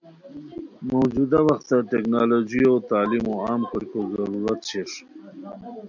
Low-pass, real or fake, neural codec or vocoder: 7.2 kHz; real; none